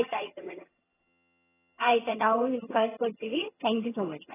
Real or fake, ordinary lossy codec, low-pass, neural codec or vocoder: fake; AAC, 16 kbps; 3.6 kHz; vocoder, 22.05 kHz, 80 mel bands, HiFi-GAN